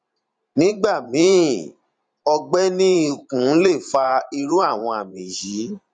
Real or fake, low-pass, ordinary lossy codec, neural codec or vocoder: fake; 9.9 kHz; none; vocoder, 44.1 kHz, 128 mel bands every 512 samples, BigVGAN v2